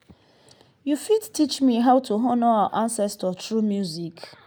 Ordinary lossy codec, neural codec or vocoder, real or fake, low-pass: none; none; real; none